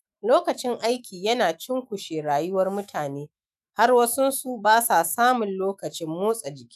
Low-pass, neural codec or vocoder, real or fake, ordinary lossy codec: 14.4 kHz; autoencoder, 48 kHz, 128 numbers a frame, DAC-VAE, trained on Japanese speech; fake; none